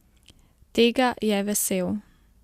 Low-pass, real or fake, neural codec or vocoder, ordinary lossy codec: 14.4 kHz; real; none; Opus, 64 kbps